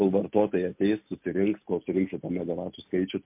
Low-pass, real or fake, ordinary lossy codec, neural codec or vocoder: 3.6 kHz; real; MP3, 32 kbps; none